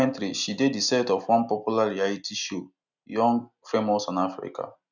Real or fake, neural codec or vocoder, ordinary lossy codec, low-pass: real; none; none; 7.2 kHz